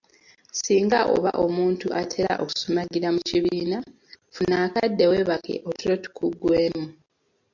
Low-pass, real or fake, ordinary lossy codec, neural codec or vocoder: 7.2 kHz; real; MP3, 48 kbps; none